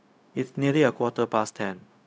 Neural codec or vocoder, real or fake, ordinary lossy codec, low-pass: codec, 16 kHz, 0.4 kbps, LongCat-Audio-Codec; fake; none; none